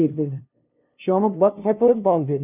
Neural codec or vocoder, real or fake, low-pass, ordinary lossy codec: codec, 16 kHz, 0.5 kbps, FunCodec, trained on LibriTTS, 25 frames a second; fake; 3.6 kHz; none